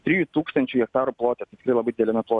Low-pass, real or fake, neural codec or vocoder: 10.8 kHz; real; none